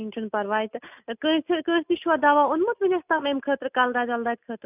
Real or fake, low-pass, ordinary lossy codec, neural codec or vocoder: real; 3.6 kHz; none; none